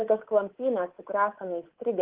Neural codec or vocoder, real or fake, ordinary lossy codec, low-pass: codec, 24 kHz, 3.1 kbps, DualCodec; fake; Opus, 16 kbps; 3.6 kHz